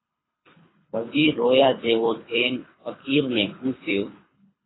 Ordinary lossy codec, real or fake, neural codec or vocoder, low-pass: AAC, 16 kbps; fake; codec, 24 kHz, 3 kbps, HILCodec; 7.2 kHz